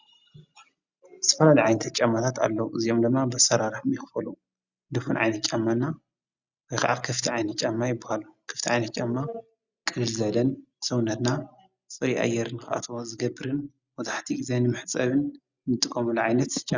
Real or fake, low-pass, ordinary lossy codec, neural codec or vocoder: real; 7.2 kHz; Opus, 64 kbps; none